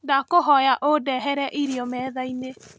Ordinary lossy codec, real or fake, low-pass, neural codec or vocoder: none; real; none; none